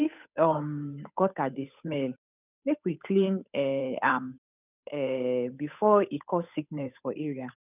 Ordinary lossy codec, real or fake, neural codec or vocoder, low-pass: none; fake; codec, 16 kHz, 8 kbps, FunCodec, trained on Chinese and English, 25 frames a second; 3.6 kHz